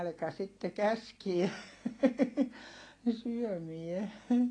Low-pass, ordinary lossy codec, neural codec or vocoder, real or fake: 9.9 kHz; AAC, 32 kbps; none; real